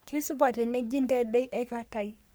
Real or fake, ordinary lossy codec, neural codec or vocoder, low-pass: fake; none; codec, 44.1 kHz, 3.4 kbps, Pupu-Codec; none